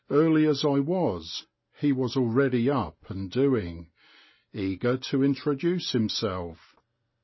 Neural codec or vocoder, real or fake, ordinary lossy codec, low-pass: none; real; MP3, 24 kbps; 7.2 kHz